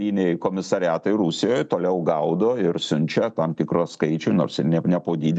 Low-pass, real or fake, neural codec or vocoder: 9.9 kHz; real; none